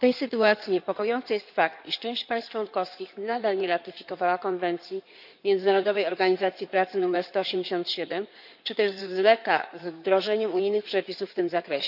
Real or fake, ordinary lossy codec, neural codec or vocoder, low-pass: fake; none; codec, 16 kHz in and 24 kHz out, 2.2 kbps, FireRedTTS-2 codec; 5.4 kHz